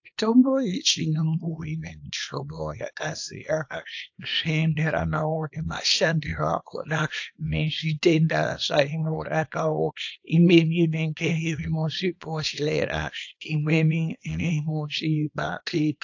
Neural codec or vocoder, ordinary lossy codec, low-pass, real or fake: codec, 24 kHz, 0.9 kbps, WavTokenizer, small release; AAC, 48 kbps; 7.2 kHz; fake